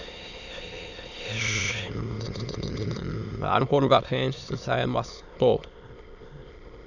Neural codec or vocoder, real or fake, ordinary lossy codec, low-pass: autoencoder, 22.05 kHz, a latent of 192 numbers a frame, VITS, trained on many speakers; fake; none; 7.2 kHz